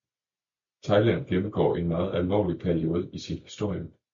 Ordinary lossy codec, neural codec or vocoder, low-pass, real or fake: MP3, 48 kbps; none; 7.2 kHz; real